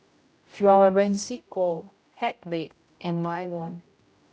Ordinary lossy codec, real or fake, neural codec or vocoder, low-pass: none; fake; codec, 16 kHz, 0.5 kbps, X-Codec, HuBERT features, trained on general audio; none